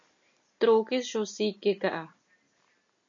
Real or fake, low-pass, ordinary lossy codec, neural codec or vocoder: real; 7.2 kHz; AAC, 64 kbps; none